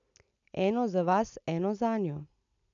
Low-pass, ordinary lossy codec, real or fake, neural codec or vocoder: 7.2 kHz; none; real; none